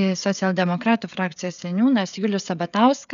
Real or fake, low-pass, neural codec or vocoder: fake; 7.2 kHz; codec, 16 kHz, 16 kbps, FreqCodec, smaller model